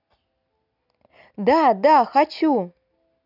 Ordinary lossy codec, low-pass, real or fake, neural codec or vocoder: none; 5.4 kHz; real; none